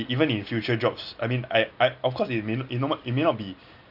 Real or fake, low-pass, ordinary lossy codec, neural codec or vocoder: real; 5.4 kHz; none; none